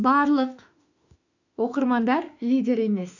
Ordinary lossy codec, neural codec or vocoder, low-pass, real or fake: none; autoencoder, 48 kHz, 32 numbers a frame, DAC-VAE, trained on Japanese speech; 7.2 kHz; fake